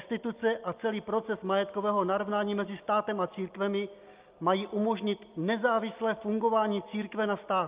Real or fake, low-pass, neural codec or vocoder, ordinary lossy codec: real; 3.6 kHz; none; Opus, 24 kbps